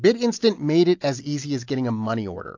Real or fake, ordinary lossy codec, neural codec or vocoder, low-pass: real; AAC, 48 kbps; none; 7.2 kHz